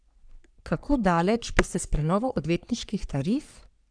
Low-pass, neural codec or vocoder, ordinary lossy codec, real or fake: 9.9 kHz; codec, 44.1 kHz, 3.4 kbps, Pupu-Codec; none; fake